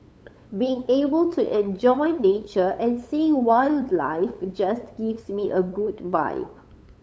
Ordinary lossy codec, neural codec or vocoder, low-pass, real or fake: none; codec, 16 kHz, 8 kbps, FunCodec, trained on LibriTTS, 25 frames a second; none; fake